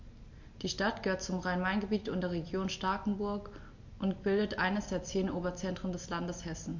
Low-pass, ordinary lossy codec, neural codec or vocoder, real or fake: 7.2 kHz; MP3, 48 kbps; none; real